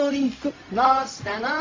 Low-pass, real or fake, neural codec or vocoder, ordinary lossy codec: 7.2 kHz; fake; codec, 16 kHz, 0.4 kbps, LongCat-Audio-Codec; AAC, 32 kbps